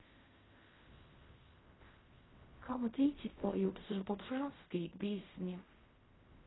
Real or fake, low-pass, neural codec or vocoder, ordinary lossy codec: fake; 7.2 kHz; codec, 16 kHz in and 24 kHz out, 0.4 kbps, LongCat-Audio-Codec, fine tuned four codebook decoder; AAC, 16 kbps